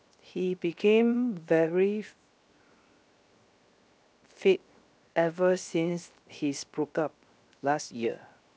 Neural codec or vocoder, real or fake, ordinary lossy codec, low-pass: codec, 16 kHz, 0.7 kbps, FocalCodec; fake; none; none